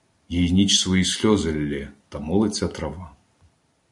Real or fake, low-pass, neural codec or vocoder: real; 10.8 kHz; none